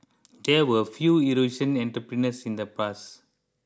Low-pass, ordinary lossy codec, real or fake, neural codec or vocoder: none; none; real; none